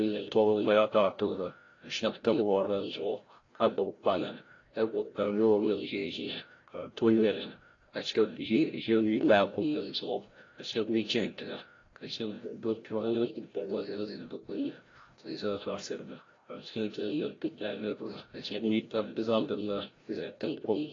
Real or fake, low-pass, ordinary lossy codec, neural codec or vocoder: fake; 7.2 kHz; AAC, 32 kbps; codec, 16 kHz, 0.5 kbps, FreqCodec, larger model